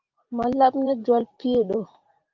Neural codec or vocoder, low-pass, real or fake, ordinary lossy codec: vocoder, 44.1 kHz, 128 mel bands every 512 samples, BigVGAN v2; 7.2 kHz; fake; Opus, 24 kbps